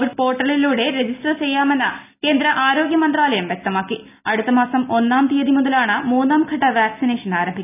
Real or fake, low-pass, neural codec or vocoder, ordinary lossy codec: real; 3.6 kHz; none; none